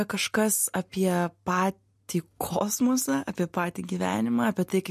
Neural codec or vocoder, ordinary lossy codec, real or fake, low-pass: none; MP3, 64 kbps; real; 14.4 kHz